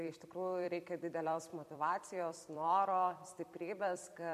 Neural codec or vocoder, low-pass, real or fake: vocoder, 44.1 kHz, 128 mel bands every 256 samples, BigVGAN v2; 14.4 kHz; fake